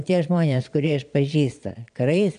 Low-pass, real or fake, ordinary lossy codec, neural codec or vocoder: 9.9 kHz; fake; AAC, 96 kbps; vocoder, 22.05 kHz, 80 mel bands, Vocos